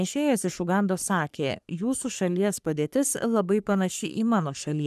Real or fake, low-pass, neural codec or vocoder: fake; 14.4 kHz; codec, 44.1 kHz, 3.4 kbps, Pupu-Codec